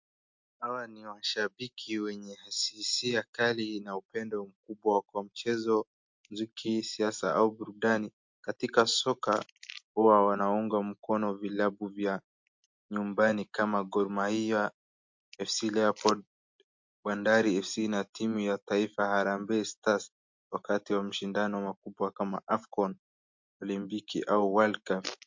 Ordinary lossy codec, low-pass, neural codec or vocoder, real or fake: MP3, 48 kbps; 7.2 kHz; none; real